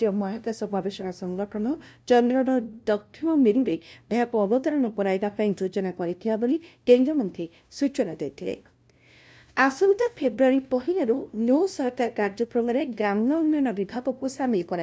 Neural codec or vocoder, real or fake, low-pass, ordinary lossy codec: codec, 16 kHz, 0.5 kbps, FunCodec, trained on LibriTTS, 25 frames a second; fake; none; none